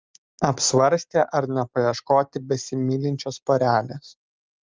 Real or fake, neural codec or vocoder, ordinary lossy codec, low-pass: real; none; Opus, 32 kbps; 7.2 kHz